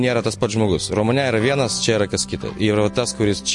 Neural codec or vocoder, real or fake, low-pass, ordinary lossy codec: none; real; 10.8 kHz; MP3, 48 kbps